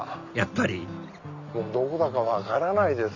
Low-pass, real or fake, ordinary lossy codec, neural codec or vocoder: 7.2 kHz; real; none; none